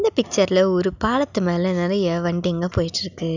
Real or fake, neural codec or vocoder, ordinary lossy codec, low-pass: real; none; none; 7.2 kHz